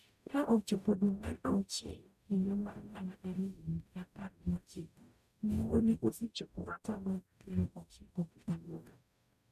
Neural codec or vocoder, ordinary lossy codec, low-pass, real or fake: codec, 44.1 kHz, 0.9 kbps, DAC; none; 14.4 kHz; fake